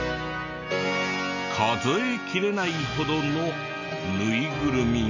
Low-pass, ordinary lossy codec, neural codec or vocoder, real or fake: 7.2 kHz; none; none; real